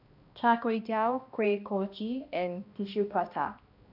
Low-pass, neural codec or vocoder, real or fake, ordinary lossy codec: 5.4 kHz; codec, 16 kHz, 1 kbps, X-Codec, HuBERT features, trained on balanced general audio; fake; none